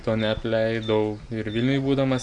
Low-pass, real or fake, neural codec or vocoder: 9.9 kHz; real; none